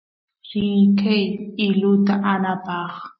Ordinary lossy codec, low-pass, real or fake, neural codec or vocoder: MP3, 24 kbps; 7.2 kHz; real; none